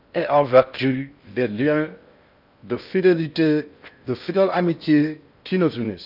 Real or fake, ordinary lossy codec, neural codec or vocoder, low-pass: fake; none; codec, 16 kHz in and 24 kHz out, 0.6 kbps, FocalCodec, streaming, 4096 codes; 5.4 kHz